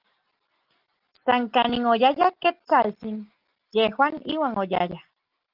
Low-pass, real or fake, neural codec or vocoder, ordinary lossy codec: 5.4 kHz; real; none; Opus, 32 kbps